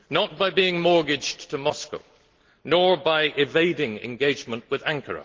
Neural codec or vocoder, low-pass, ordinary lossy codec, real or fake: none; 7.2 kHz; Opus, 16 kbps; real